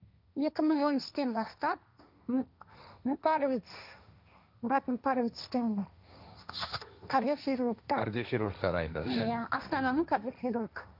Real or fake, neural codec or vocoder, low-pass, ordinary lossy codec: fake; codec, 16 kHz, 1.1 kbps, Voila-Tokenizer; 5.4 kHz; none